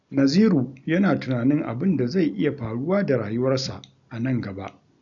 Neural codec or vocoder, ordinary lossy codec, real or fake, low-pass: none; none; real; 7.2 kHz